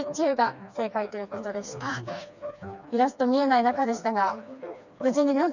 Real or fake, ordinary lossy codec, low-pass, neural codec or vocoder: fake; none; 7.2 kHz; codec, 16 kHz, 2 kbps, FreqCodec, smaller model